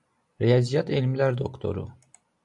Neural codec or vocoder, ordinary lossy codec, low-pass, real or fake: none; AAC, 64 kbps; 10.8 kHz; real